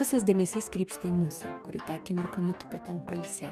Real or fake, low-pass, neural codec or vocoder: fake; 14.4 kHz; codec, 44.1 kHz, 2.6 kbps, DAC